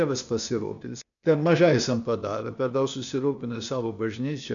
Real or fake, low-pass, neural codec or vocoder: fake; 7.2 kHz; codec, 16 kHz, 0.8 kbps, ZipCodec